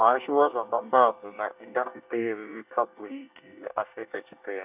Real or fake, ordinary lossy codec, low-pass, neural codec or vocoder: fake; none; 3.6 kHz; codec, 24 kHz, 1 kbps, SNAC